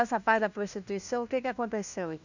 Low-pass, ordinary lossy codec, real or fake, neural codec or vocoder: 7.2 kHz; none; fake; codec, 16 kHz, 1 kbps, FunCodec, trained on LibriTTS, 50 frames a second